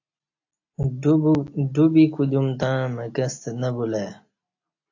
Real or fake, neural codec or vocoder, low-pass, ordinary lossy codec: real; none; 7.2 kHz; AAC, 48 kbps